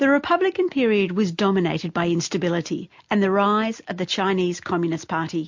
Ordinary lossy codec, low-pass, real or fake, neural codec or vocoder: MP3, 48 kbps; 7.2 kHz; real; none